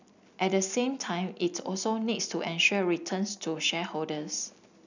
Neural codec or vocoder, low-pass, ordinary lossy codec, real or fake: none; 7.2 kHz; none; real